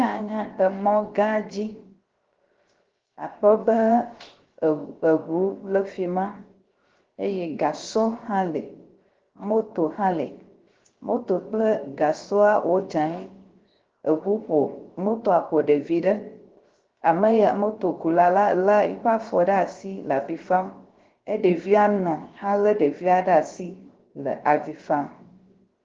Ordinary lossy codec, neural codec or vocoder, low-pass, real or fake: Opus, 16 kbps; codec, 16 kHz, 0.7 kbps, FocalCodec; 7.2 kHz; fake